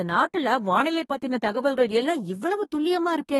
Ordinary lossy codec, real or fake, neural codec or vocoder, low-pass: AAC, 32 kbps; fake; codec, 32 kHz, 1.9 kbps, SNAC; 14.4 kHz